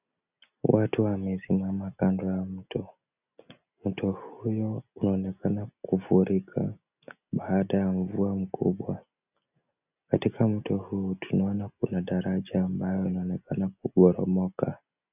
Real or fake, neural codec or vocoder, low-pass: real; none; 3.6 kHz